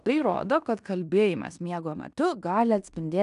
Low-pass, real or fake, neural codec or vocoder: 10.8 kHz; fake; codec, 16 kHz in and 24 kHz out, 0.9 kbps, LongCat-Audio-Codec, fine tuned four codebook decoder